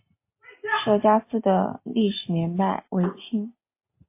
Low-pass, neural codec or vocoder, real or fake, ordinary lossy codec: 3.6 kHz; none; real; MP3, 24 kbps